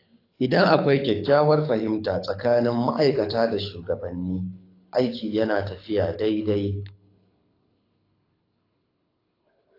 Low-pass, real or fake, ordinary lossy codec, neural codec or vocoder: 5.4 kHz; fake; AAC, 32 kbps; codec, 24 kHz, 6 kbps, HILCodec